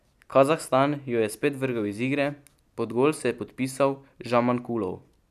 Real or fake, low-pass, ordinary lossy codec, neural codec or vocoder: real; 14.4 kHz; none; none